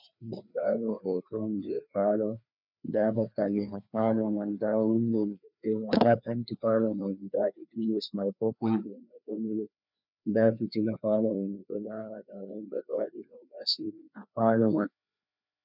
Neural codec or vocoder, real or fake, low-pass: codec, 16 kHz, 2 kbps, FreqCodec, larger model; fake; 5.4 kHz